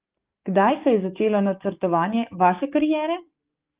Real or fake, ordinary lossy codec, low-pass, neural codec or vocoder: fake; Opus, 32 kbps; 3.6 kHz; codec, 44.1 kHz, 7.8 kbps, DAC